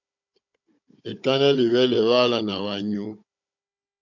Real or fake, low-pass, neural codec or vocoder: fake; 7.2 kHz; codec, 16 kHz, 16 kbps, FunCodec, trained on Chinese and English, 50 frames a second